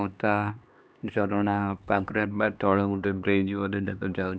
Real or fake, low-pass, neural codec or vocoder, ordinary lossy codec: fake; none; codec, 16 kHz, 2 kbps, X-Codec, HuBERT features, trained on LibriSpeech; none